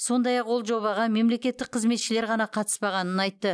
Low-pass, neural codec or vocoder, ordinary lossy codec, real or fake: none; none; none; real